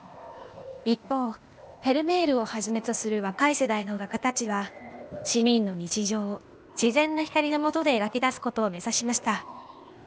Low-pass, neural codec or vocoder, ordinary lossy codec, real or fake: none; codec, 16 kHz, 0.8 kbps, ZipCodec; none; fake